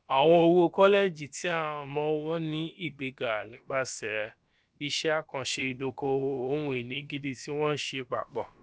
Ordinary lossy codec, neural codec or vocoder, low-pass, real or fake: none; codec, 16 kHz, about 1 kbps, DyCAST, with the encoder's durations; none; fake